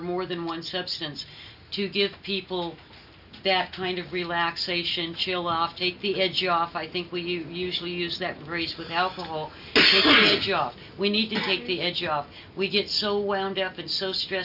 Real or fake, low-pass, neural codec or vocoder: real; 5.4 kHz; none